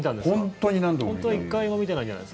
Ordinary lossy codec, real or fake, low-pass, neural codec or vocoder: none; real; none; none